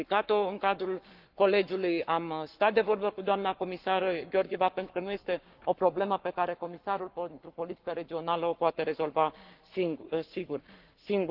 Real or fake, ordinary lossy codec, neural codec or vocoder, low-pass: fake; Opus, 32 kbps; codec, 44.1 kHz, 7.8 kbps, Pupu-Codec; 5.4 kHz